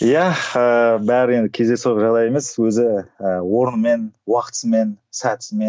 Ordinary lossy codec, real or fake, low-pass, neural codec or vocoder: none; real; none; none